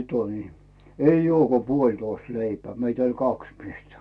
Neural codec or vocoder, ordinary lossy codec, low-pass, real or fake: none; none; none; real